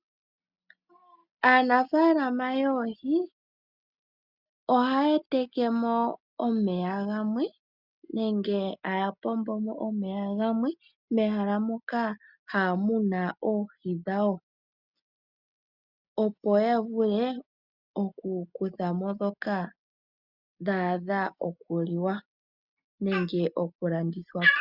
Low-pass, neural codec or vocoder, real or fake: 5.4 kHz; none; real